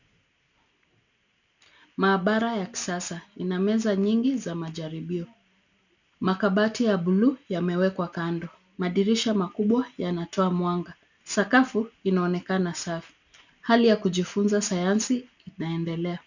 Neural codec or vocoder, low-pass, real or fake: none; 7.2 kHz; real